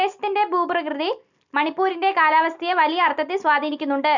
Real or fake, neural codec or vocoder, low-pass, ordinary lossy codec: real; none; 7.2 kHz; none